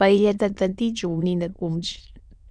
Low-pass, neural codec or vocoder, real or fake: 9.9 kHz; autoencoder, 22.05 kHz, a latent of 192 numbers a frame, VITS, trained on many speakers; fake